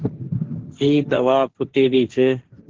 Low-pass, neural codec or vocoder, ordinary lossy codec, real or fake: 7.2 kHz; codec, 16 kHz, 1.1 kbps, Voila-Tokenizer; Opus, 16 kbps; fake